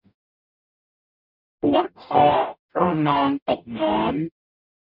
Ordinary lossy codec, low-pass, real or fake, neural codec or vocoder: none; 5.4 kHz; fake; codec, 44.1 kHz, 0.9 kbps, DAC